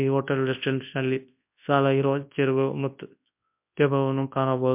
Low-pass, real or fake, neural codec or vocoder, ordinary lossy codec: 3.6 kHz; fake; codec, 24 kHz, 0.9 kbps, WavTokenizer, large speech release; MP3, 32 kbps